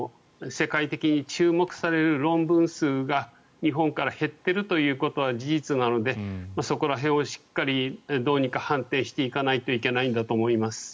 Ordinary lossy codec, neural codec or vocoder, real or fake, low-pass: none; none; real; none